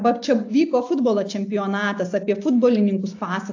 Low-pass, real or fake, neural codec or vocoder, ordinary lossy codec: 7.2 kHz; real; none; AAC, 48 kbps